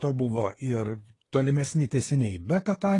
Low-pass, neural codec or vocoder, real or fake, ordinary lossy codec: 10.8 kHz; codec, 24 kHz, 1 kbps, SNAC; fake; AAC, 32 kbps